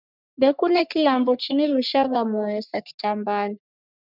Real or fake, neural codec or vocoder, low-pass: fake; codec, 44.1 kHz, 3.4 kbps, Pupu-Codec; 5.4 kHz